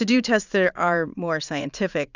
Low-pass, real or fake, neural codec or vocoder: 7.2 kHz; fake; vocoder, 44.1 kHz, 80 mel bands, Vocos